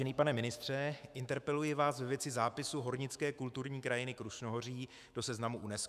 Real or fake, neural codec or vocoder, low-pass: fake; autoencoder, 48 kHz, 128 numbers a frame, DAC-VAE, trained on Japanese speech; 14.4 kHz